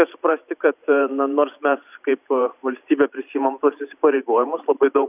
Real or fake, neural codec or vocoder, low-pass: fake; vocoder, 44.1 kHz, 128 mel bands every 512 samples, BigVGAN v2; 3.6 kHz